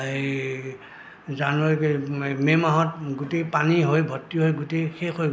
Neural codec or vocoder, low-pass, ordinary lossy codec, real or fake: none; none; none; real